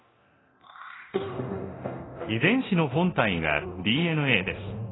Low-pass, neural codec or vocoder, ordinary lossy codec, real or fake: 7.2 kHz; codec, 24 kHz, 0.9 kbps, DualCodec; AAC, 16 kbps; fake